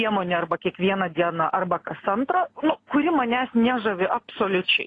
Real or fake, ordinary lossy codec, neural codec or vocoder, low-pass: real; AAC, 32 kbps; none; 9.9 kHz